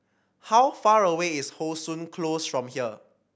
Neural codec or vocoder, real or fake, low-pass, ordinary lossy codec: none; real; none; none